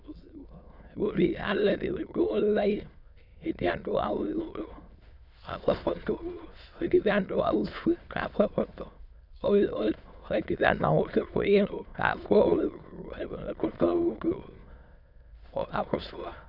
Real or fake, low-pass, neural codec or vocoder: fake; 5.4 kHz; autoencoder, 22.05 kHz, a latent of 192 numbers a frame, VITS, trained on many speakers